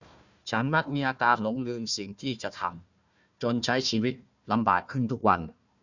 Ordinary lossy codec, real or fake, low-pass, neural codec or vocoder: none; fake; 7.2 kHz; codec, 16 kHz, 1 kbps, FunCodec, trained on Chinese and English, 50 frames a second